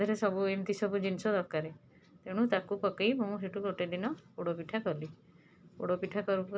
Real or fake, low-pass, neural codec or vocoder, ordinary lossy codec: real; none; none; none